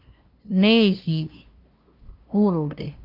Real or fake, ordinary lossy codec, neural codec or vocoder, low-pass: fake; Opus, 24 kbps; codec, 16 kHz, 0.5 kbps, FunCodec, trained on LibriTTS, 25 frames a second; 5.4 kHz